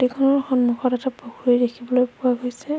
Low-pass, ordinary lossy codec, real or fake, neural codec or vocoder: none; none; real; none